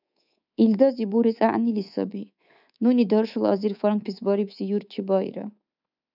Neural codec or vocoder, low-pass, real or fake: codec, 24 kHz, 3.1 kbps, DualCodec; 5.4 kHz; fake